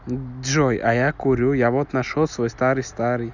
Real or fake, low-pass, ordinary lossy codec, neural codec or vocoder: real; 7.2 kHz; none; none